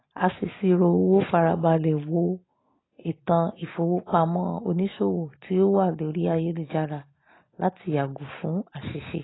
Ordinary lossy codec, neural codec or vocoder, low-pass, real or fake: AAC, 16 kbps; none; 7.2 kHz; real